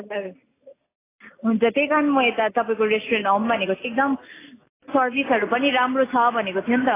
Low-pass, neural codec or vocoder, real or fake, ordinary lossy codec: 3.6 kHz; none; real; AAC, 16 kbps